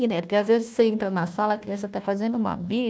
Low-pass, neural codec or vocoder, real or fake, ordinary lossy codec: none; codec, 16 kHz, 1 kbps, FunCodec, trained on Chinese and English, 50 frames a second; fake; none